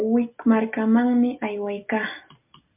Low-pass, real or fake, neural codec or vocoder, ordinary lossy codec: 3.6 kHz; real; none; MP3, 32 kbps